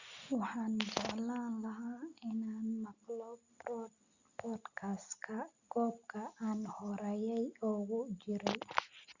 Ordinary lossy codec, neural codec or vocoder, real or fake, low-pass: Opus, 64 kbps; none; real; 7.2 kHz